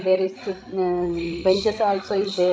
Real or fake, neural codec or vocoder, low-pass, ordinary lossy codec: fake; codec, 16 kHz, 16 kbps, FreqCodec, larger model; none; none